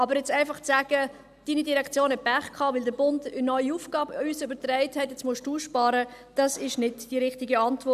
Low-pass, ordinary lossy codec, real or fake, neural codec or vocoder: 14.4 kHz; none; real; none